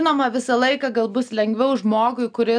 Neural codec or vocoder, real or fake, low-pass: none; real; 9.9 kHz